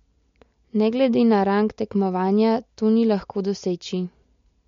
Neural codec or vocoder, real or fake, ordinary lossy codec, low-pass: none; real; MP3, 48 kbps; 7.2 kHz